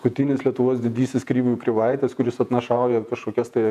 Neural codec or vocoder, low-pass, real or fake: vocoder, 48 kHz, 128 mel bands, Vocos; 14.4 kHz; fake